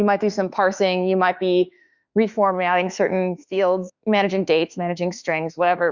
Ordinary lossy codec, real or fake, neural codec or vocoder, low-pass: Opus, 64 kbps; fake; autoencoder, 48 kHz, 32 numbers a frame, DAC-VAE, trained on Japanese speech; 7.2 kHz